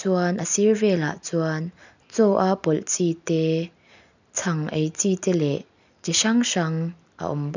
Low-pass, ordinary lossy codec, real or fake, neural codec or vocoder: 7.2 kHz; none; real; none